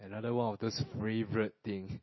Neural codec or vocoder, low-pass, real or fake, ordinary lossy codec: none; 7.2 kHz; real; MP3, 24 kbps